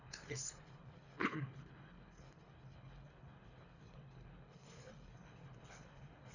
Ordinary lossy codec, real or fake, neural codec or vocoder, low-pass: none; fake; codec, 24 kHz, 3 kbps, HILCodec; 7.2 kHz